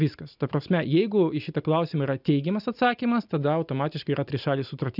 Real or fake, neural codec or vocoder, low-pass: real; none; 5.4 kHz